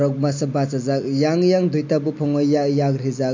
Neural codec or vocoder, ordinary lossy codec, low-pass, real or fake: none; MP3, 48 kbps; 7.2 kHz; real